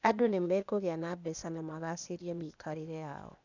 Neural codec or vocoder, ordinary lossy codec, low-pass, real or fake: codec, 16 kHz, 0.8 kbps, ZipCodec; none; 7.2 kHz; fake